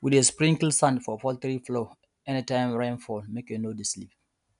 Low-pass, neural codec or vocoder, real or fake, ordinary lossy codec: 10.8 kHz; none; real; none